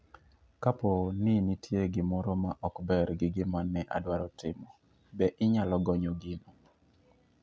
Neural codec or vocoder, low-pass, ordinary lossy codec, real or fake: none; none; none; real